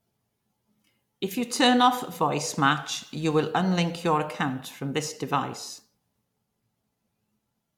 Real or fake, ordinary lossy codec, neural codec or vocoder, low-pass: real; MP3, 96 kbps; none; 19.8 kHz